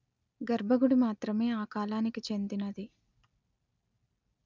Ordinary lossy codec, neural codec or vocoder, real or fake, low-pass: none; none; real; 7.2 kHz